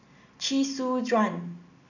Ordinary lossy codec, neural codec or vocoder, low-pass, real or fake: none; none; 7.2 kHz; real